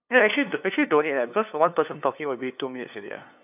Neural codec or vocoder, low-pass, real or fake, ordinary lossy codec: codec, 16 kHz, 2 kbps, FunCodec, trained on LibriTTS, 25 frames a second; 3.6 kHz; fake; none